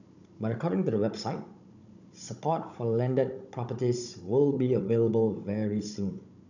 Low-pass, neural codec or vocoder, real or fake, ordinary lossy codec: 7.2 kHz; codec, 16 kHz, 16 kbps, FunCodec, trained on Chinese and English, 50 frames a second; fake; none